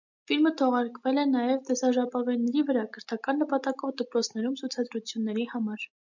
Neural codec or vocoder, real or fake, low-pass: none; real; 7.2 kHz